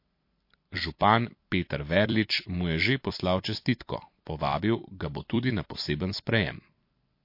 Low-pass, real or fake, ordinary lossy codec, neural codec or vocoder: 5.4 kHz; fake; MP3, 32 kbps; vocoder, 44.1 kHz, 128 mel bands every 256 samples, BigVGAN v2